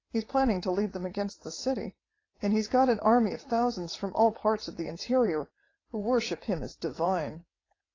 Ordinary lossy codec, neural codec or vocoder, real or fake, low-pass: AAC, 32 kbps; vocoder, 22.05 kHz, 80 mel bands, WaveNeXt; fake; 7.2 kHz